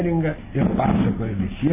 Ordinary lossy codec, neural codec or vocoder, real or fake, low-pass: MP3, 16 kbps; none; real; 3.6 kHz